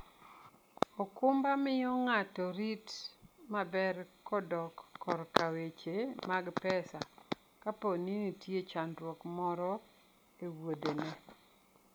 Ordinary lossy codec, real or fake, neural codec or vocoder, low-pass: none; real; none; none